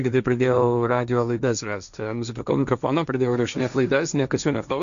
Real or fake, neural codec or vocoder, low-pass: fake; codec, 16 kHz, 1.1 kbps, Voila-Tokenizer; 7.2 kHz